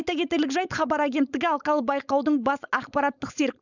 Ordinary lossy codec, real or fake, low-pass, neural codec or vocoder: none; fake; 7.2 kHz; codec, 16 kHz, 4.8 kbps, FACodec